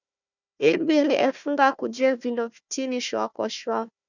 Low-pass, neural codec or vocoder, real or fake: 7.2 kHz; codec, 16 kHz, 1 kbps, FunCodec, trained on Chinese and English, 50 frames a second; fake